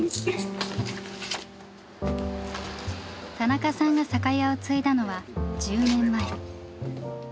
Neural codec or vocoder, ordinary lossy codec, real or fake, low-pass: none; none; real; none